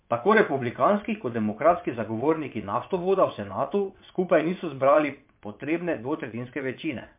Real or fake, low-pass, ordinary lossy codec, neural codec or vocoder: fake; 3.6 kHz; MP3, 32 kbps; vocoder, 22.05 kHz, 80 mel bands, WaveNeXt